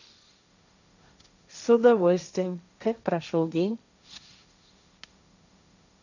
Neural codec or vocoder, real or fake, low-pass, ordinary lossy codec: codec, 16 kHz, 1.1 kbps, Voila-Tokenizer; fake; 7.2 kHz; none